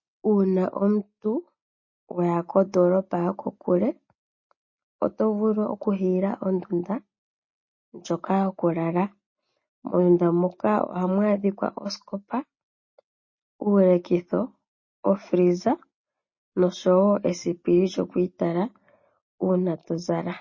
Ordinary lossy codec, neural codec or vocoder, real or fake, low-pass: MP3, 32 kbps; none; real; 7.2 kHz